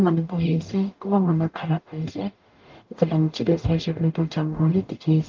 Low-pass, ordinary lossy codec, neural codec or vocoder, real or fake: 7.2 kHz; Opus, 24 kbps; codec, 44.1 kHz, 0.9 kbps, DAC; fake